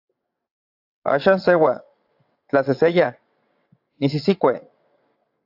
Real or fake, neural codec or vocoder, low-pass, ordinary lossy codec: fake; vocoder, 22.05 kHz, 80 mel bands, WaveNeXt; 5.4 kHz; AAC, 48 kbps